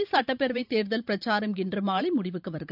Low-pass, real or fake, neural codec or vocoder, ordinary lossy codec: 5.4 kHz; fake; vocoder, 44.1 kHz, 128 mel bands every 512 samples, BigVGAN v2; none